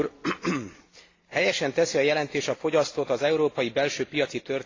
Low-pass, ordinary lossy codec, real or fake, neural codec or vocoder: 7.2 kHz; AAC, 32 kbps; real; none